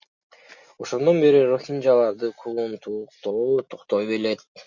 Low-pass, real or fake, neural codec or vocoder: 7.2 kHz; real; none